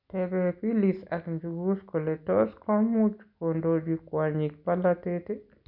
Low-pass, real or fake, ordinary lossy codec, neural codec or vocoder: 5.4 kHz; real; none; none